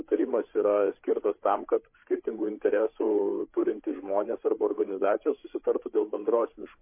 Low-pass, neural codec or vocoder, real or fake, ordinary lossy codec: 3.6 kHz; codec, 16 kHz, 8 kbps, FreqCodec, larger model; fake; MP3, 24 kbps